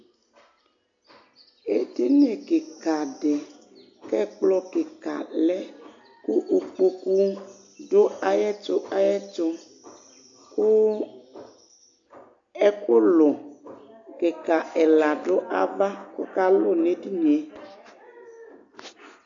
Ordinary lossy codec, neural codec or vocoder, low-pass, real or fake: AAC, 64 kbps; none; 7.2 kHz; real